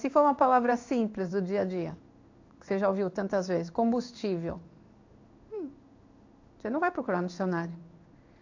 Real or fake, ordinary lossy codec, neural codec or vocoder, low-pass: fake; AAC, 48 kbps; codec, 16 kHz in and 24 kHz out, 1 kbps, XY-Tokenizer; 7.2 kHz